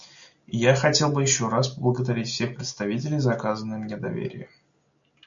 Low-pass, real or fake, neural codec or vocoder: 7.2 kHz; real; none